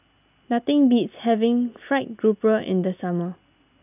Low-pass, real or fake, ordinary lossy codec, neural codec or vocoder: 3.6 kHz; real; none; none